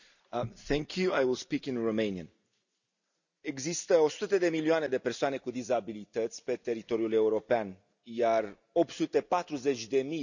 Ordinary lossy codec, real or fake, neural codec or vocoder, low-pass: none; real; none; 7.2 kHz